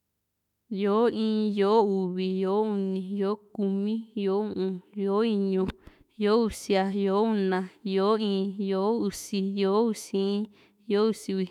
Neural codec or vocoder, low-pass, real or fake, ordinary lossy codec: autoencoder, 48 kHz, 32 numbers a frame, DAC-VAE, trained on Japanese speech; 19.8 kHz; fake; none